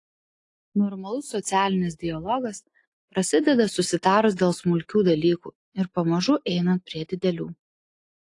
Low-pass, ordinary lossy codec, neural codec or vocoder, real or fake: 10.8 kHz; AAC, 48 kbps; none; real